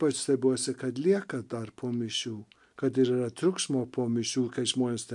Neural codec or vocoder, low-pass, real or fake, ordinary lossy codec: none; 10.8 kHz; real; MP3, 64 kbps